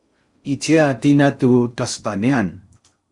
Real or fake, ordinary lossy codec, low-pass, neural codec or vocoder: fake; Opus, 64 kbps; 10.8 kHz; codec, 16 kHz in and 24 kHz out, 0.6 kbps, FocalCodec, streaming, 4096 codes